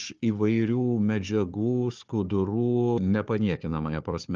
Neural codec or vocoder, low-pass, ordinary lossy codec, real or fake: codec, 16 kHz, 4 kbps, FunCodec, trained on Chinese and English, 50 frames a second; 7.2 kHz; Opus, 24 kbps; fake